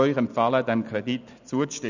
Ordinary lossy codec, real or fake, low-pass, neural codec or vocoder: none; real; 7.2 kHz; none